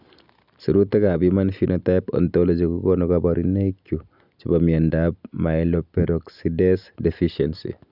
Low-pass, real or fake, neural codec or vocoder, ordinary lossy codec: 5.4 kHz; real; none; none